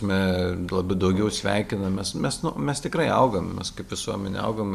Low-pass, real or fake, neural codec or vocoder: 14.4 kHz; real; none